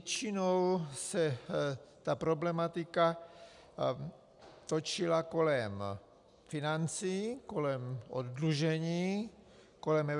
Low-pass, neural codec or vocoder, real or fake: 10.8 kHz; none; real